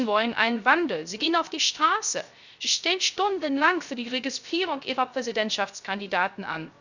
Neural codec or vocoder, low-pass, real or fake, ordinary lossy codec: codec, 16 kHz, 0.3 kbps, FocalCodec; 7.2 kHz; fake; none